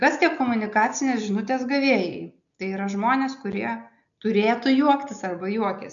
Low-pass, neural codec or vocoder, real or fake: 7.2 kHz; none; real